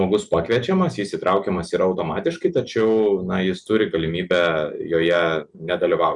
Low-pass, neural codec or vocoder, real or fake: 10.8 kHz; none; real